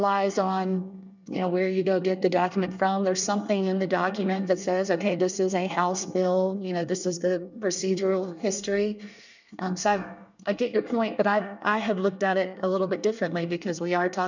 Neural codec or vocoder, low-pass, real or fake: codec, 24 kHz, 1 kbps, SNAC; 7.2 kHz; fake